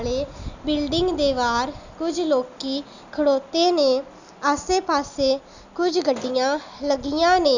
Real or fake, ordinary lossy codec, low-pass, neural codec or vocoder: real; none; 7.2 kHz; none